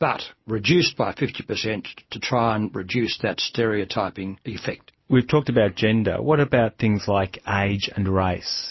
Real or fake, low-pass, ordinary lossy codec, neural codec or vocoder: real; 7.2 kHz; MP3, 24 kbps; none